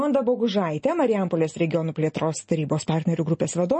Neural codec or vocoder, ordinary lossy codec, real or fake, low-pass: none; MP3, 32 kbps; real; 10.8 kHz